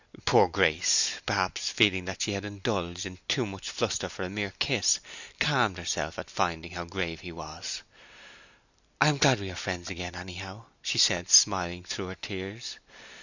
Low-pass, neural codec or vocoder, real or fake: 7.2 kHz; none; real